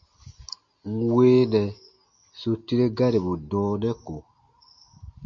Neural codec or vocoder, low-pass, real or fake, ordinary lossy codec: none; 7.2 kHz; real; MP3, 48 kbps